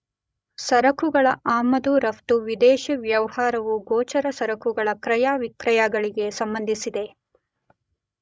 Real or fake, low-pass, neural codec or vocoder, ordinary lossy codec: fake; none; codec, 16 kHz, 16 kbps, FreqCodec, larger model; none